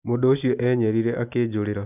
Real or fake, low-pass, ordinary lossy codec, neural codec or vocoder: real; 3.6 kHz; none; none